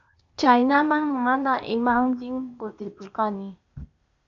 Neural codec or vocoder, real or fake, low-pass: codec, 16 kHz, 0.8 kbps, ZipCodec; fake; 7.2 kHz